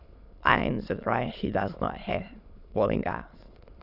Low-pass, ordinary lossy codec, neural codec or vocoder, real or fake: 5.4 kHz; none; autoencoder, 22.05 kHz, a latent of 192 numbers a frame, VITS, trained on many speakers; fake